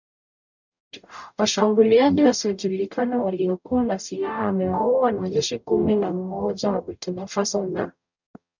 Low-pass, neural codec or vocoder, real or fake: 7.2 kHz; codec, 44.1 kHz, 0.9 kbps, DAC; fake